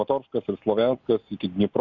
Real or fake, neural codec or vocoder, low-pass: real; none; 7.2 kHz